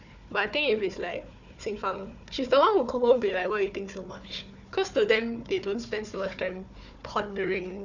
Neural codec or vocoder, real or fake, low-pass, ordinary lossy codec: codec, 16 kHz, 4 kbps, FunCodec, trained on Chinese and English, 50 frames a second; fake; 7.2 kHz; none